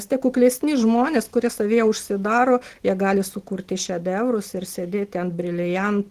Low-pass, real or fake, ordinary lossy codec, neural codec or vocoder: 14.4 kHz; real; Opus, 16 kbps; none